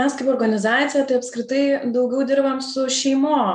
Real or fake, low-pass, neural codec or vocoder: real; 9.9 kHz; none